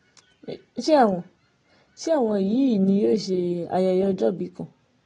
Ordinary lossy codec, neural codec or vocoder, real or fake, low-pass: AAC, 32 kbps; none; real; 9.9 kHz